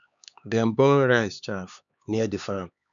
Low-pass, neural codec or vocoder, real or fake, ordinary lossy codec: 7.2 kHz; codec, 16 kHz, 4 kbps, X-Codec, HuBERT features, trained on LibriSpeech; fake; none